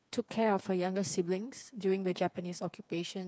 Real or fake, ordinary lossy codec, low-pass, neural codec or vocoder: fake; none; none; codec, 16 kHz, 4 kbps, FreqCodec, smaller model